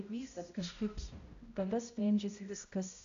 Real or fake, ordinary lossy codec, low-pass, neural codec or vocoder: fake; AAC, 64 kbps; 7.2 kHz; codec, 16 kHz, 0.5 kbps, X-Codec, HuBERT features, trained on balanced general audio